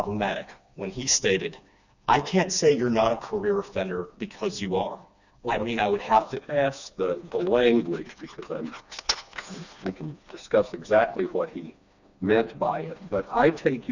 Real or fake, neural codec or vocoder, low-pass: fake; codec, 16 kHz, 2 kbps, FreqCodec, smaller model; 7.2 kHz